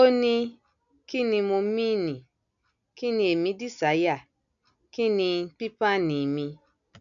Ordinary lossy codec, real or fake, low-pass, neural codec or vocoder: none; real; 7.2 kHz; none